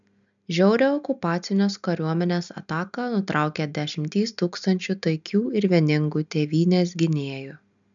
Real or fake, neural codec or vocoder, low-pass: real; none; 7.2 kHz